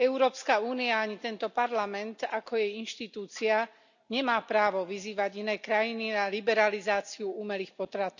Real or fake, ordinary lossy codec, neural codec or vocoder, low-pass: real; none; none; 7.2 kHz